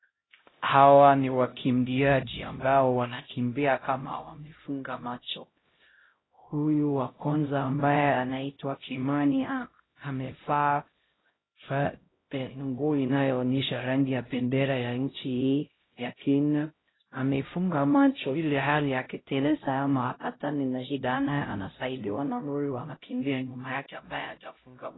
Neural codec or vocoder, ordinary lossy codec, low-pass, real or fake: codec, 16 kHz, 0.5 kbps, X-Codec, HuBERT features, trained on LibriSpeech; AAC, 16 kbps; 7.2 kHz; fake